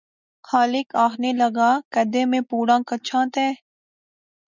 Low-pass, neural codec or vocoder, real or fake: 7.2 kHz; none; real